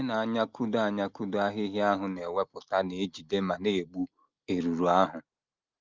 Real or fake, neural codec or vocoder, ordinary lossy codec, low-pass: real; none; Opus, 24 kbps; 7.2 kHz